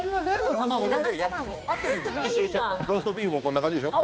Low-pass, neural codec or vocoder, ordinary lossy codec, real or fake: none; codec, 16 kHz, 2 kbps, X-Codec, HuBERT features, trained on balanced general audio; none; fake